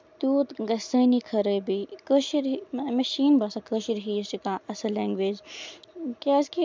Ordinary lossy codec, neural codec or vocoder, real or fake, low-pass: none; none; real; none